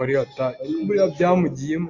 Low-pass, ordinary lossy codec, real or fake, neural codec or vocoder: 7.2 kHz; AAC, 48 kbps; real; none